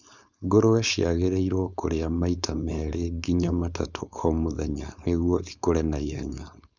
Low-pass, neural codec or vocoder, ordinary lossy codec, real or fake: 7.2 kHz; codec, 16 kHz, 4.8 kbps, FACodec; Opus, 64 kbps; fake